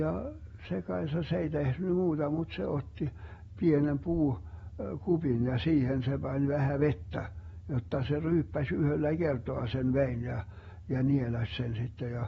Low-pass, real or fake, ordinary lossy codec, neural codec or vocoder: 19.8 kHz; real; AAC, 24 kbps; none